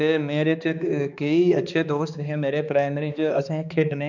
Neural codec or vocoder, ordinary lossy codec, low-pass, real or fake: codec, 16 kHz, 2 kbps, X-Codec, HuBERT features, trained on balanced general audio; none; 7.2 kHz; fake